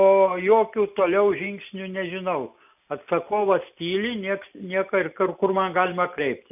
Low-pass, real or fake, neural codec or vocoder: 3.6 kHz; real; none